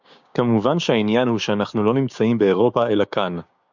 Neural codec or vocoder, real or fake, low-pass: codec, 16 kHz, 6 kbps, DAC; fake; 7.2 kHz